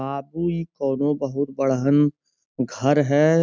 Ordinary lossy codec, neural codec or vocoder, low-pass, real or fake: none; none; none; real